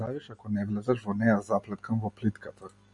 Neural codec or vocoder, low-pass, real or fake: none; 10.8 kHz; real